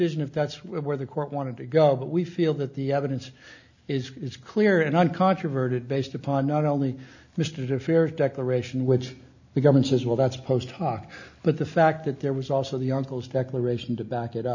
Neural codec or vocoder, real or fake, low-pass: none; real; 7.2 kHz